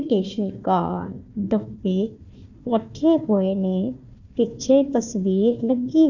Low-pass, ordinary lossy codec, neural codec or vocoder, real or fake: 7.2 kHz; none; codec, 16 kHz, 1 kbps, FunCodec, trained on Chinese and English, 50 frames a second; fake